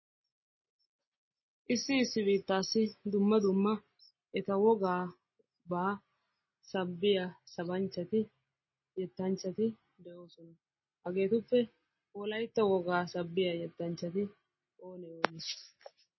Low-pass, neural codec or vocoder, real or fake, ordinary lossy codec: 7.2 kHz; none; real; MP3, 24 kbps